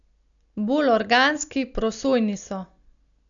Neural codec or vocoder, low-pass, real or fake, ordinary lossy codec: none; 7.2 kHz; real; none